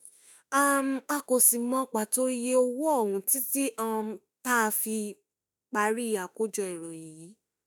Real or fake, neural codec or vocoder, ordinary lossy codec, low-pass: fake; autoencoder, 48 kHz, 32 numbers a frame, DAC-VAE, trained on Japanese speech; none; none